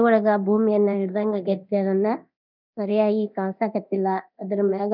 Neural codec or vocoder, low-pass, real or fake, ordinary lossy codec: codec, 24 kHz, 0.9 kbps, DualCodec; 5.4 kHz; fake; none